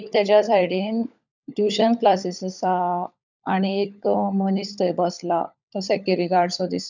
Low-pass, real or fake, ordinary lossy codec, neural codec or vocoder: 7.2 kHz; fake; none; codec, 16 kHz, 16 kbps, FunCodec, trained on LibriTTS, 50 frames a second